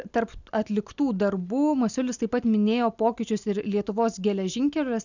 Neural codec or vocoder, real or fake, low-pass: none; real; 7.2 kHz